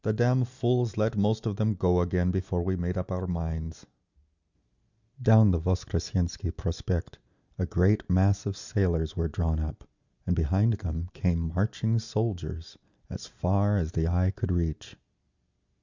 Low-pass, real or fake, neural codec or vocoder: 7.2 kHz; real; none